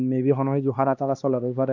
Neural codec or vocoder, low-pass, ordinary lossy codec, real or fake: codec, 16 kHz, 2 kbps, X-Codec, HuBERT features, trained on LibriSpeech; 7.2 kHz; none; fake